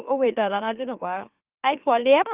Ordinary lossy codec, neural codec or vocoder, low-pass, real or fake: Opus, 24 kbps; autoencoder, 44.1 kHz, a latent of 192 numbers a frame, MeloTTS; 3.6 kHz; fake